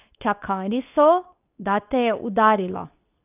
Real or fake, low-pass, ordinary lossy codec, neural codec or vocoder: fake; 3.6 kHz; none; codec, 24 kHz, 0.9 kbps, WavTokenizer, medium speech release version 2